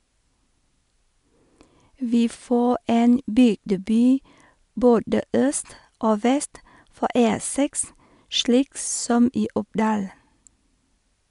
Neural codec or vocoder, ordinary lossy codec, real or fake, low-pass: none; none; real; 10.8 kHz